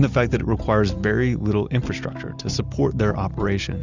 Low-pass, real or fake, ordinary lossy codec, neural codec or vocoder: 7.2 kHz; real; Opus, 64 kbps; none